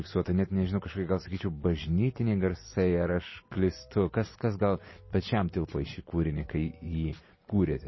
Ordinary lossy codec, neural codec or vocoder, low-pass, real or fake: MP3, 24 kbps; none; 7.2 kHz; real